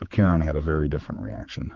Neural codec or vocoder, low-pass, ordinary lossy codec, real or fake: codec, 16 kHz, 4 kbps, X-Codec, HuBERT features, trained on general audio; 7.2 kHz; Opus, 24 kbps; fake